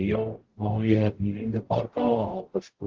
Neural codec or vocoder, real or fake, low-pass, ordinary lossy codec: codec, 44.1 kHz, 0.9 kbps, DAC; fake; 7.2 kHz; Opus, 16 kbps